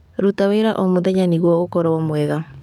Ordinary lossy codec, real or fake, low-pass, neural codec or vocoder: none; fake; 19.8 kHz; codec, 44.1 kHz, 7.8 kbps, DAC